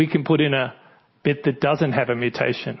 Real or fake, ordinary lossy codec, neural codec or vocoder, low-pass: real; MP3, 24 kbps; none; 7.2 kHz